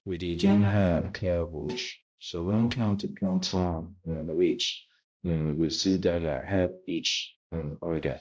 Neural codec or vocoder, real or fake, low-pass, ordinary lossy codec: codec, 16 kHz, 0.5 kbps, X-Codec, HuBERT features, trained on balanced general audio; fake; none; none